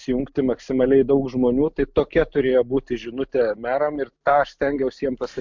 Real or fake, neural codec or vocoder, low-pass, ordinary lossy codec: real; none; 7.2 kHz; MP3, 64 kbps